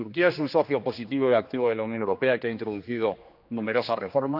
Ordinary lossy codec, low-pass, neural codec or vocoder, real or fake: none; 5.4 kHz; codec, 16 kHz, 2 kbps, X-Codec, HuBERT features, trained on general audio; fake